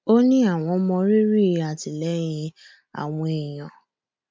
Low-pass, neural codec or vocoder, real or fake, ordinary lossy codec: none; none; real; none